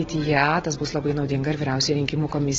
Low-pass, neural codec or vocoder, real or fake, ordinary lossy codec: 7.2 kHz; none; real; AAC, 24 kbps